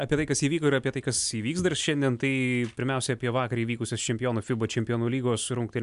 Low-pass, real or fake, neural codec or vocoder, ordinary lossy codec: 10.8 kHz; real; none; MP3, 96 kbps